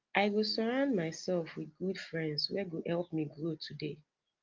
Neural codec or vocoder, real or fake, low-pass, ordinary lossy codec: none; real; 7.2 kHz; Opus, 24 kbps